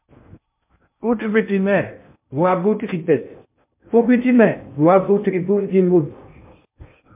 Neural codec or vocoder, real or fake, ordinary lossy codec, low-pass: codec, 16 kHz in and 24 kHz out, 0.6 kbps, FocalCodec, streaming, 2048 codes; fake; MP3, 32 kbps; 3.6 kHz